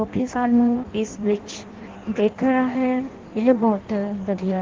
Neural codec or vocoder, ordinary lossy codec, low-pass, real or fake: codec, 16 kHz in and 24 kHz out, 0.6 kbps, FireRedTTS-2 codec; Opus, 16 kbps; 7.2 kHz; fake